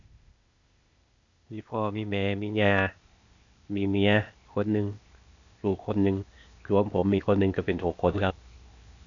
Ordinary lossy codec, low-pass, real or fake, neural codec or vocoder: none; 7.2 kHz; fake; codec, 16 kHz, 0.8 kbps, ZipCodec